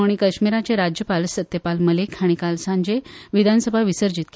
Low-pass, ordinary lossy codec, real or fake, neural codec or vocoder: none; none; real; none